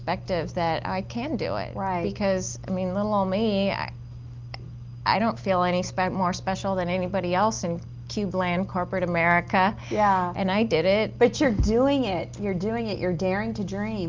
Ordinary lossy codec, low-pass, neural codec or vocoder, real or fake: Opus, 24 kbps; 7.2 kHz; none; real